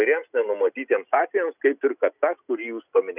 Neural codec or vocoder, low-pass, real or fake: none; 3.6 kHz; real